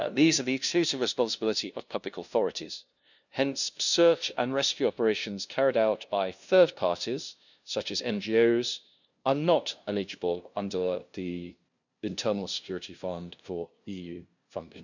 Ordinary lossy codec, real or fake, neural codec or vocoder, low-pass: none; fake; codec, 16 kHz, 0.5 kbps, FunCodec, trained on LibriTTS, 25 frames a second; 7.2 kHz